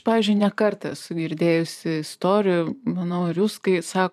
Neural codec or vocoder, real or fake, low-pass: vocoder, 44.1 kHz, 128 mel bands every 512 samples, BigVGAN v2; fake; 14.4 kHz